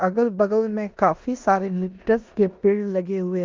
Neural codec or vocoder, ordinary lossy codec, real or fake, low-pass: codec, 16 kHz in and 24 kHz out, 0.9 kbps, LongCat-Audio-Codec, four codebook decoder; Opus, 24 kbps; fake; 7.2 kHz